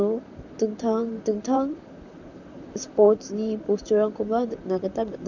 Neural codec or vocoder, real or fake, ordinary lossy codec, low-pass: vocoder, 44.1 kHz, 128 mel bands, Pupu-Vocoder; fake; none; 7.2 kHz